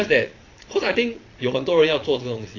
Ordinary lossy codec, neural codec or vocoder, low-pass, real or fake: AAC, 32 kbps; vocoder, 22.05 kHz, 80 mel bands, Vocos; 7.2 kHz; fake